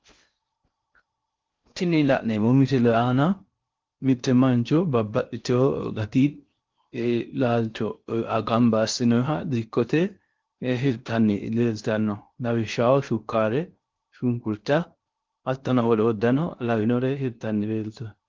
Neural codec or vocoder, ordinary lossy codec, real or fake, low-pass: codec, 16 kHz in and 24 kHz out, 0.6 kbps, FocalCodec, streaming, 4096 codes; Opus, 32 kbps; fake; 7.2 kHz